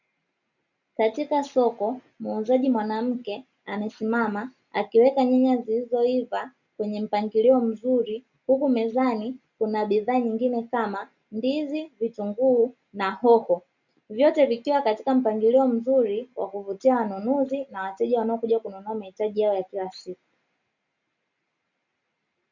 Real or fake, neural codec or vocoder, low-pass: real; none; 7.2 kHz